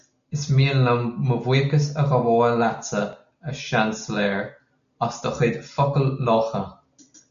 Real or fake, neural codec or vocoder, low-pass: real; none; 7.2 kHz